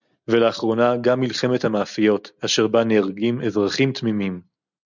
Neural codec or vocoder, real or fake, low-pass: none; real; 7.2 kHz